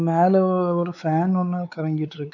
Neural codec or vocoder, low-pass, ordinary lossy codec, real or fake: codec, 16 kHz, 4 kbps, X-Codec, WavLM features, trained on Multilingual LibriSpeech; 7.2 kHz; none; fake